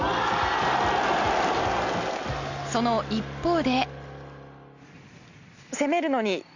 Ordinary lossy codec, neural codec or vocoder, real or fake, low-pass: Opus, 64 kbps; none; real; 7.2 kHz